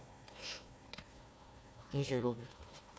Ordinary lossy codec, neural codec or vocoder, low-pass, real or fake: none; codec, 16 kHz, 1 kbps, FunCodec, trained on Chinese and English, 50 frames a second; none; fake